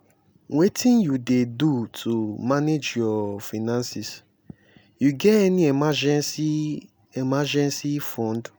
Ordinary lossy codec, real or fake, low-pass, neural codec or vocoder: none; real; none; none